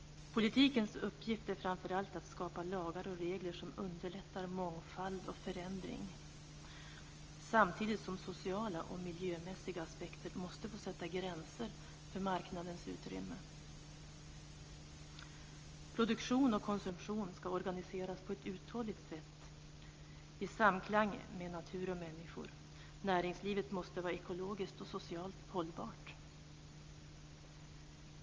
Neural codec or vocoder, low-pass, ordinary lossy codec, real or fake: none; 7.2 kHz; Opus, 24 kbps; real